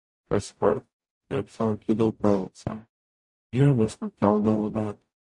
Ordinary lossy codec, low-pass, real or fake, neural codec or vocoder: MP3, 48 kbps; 10.8 kHz; fake; codec, 44.1 kHz, 0.9 kbps, DAC